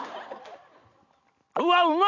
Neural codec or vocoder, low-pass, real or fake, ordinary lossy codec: none; 7.2 kHz; real; none